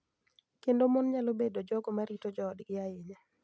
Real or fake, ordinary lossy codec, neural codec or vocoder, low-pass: real; none; none; none